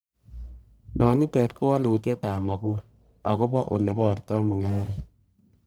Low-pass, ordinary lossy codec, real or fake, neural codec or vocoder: none; none; fake; codec, 44.1 kHz, 1.7 kbps, Pupu-Codec